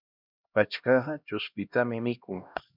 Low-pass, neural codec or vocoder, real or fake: 5.4 kHz; codec, 16 kHz, 1 kbps, X-Codec, HuBERT features, trained on LibriSpeech; fake